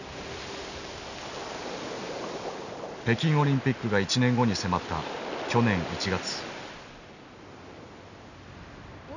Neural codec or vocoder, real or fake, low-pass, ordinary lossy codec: none; real; 7.2 kHz; none